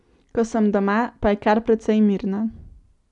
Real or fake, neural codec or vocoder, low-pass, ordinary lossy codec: real; none; 10.8 kHz; none